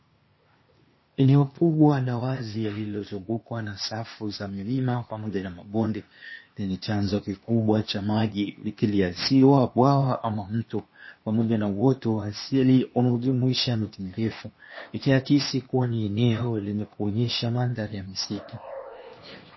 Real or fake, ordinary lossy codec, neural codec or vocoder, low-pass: fake; MP3, 24 kbps; codec, 16 kHz, 0.8 kbps, ZipCodec; 7.2 kHz